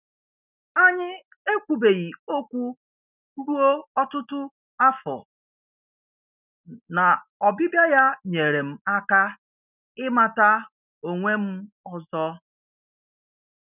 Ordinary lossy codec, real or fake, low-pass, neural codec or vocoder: none; real; 3.6 kHz; none